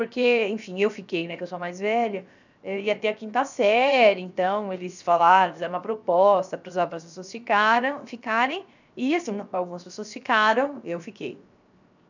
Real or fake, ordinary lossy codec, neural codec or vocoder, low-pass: fake; none; codec, 16 kHz, 0.7 kbps, FocalCodec; 7.2 kHz